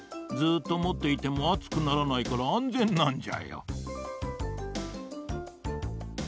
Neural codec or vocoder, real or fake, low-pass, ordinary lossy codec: none; real; none; none